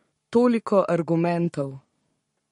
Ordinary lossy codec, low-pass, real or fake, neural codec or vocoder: MP3, 48 kbps; 19.8 kHz; fake; codec, 44.1 kHz, 7.8 kbps, Pupu-Codec